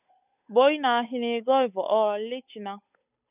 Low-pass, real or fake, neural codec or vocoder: 3.6 kHz; fake; codec, 16 kHz, 8 kbps, FunCodec, trained on Chinese and English, 25 frames a second